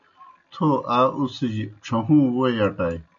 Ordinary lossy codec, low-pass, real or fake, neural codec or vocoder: MP3, 64 kbps; 7.2 kHz; real; none